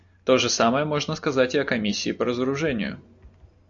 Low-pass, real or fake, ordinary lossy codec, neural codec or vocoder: 7.2 kHz; real; AAC, 64 kbps; none